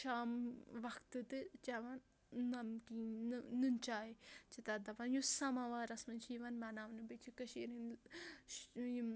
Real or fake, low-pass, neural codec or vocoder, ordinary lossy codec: real; none; none; none